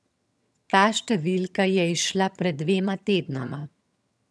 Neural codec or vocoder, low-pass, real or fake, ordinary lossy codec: vocoder, 22.05 kHz, 80 mel bands, HiFi-GAN; none; fake; none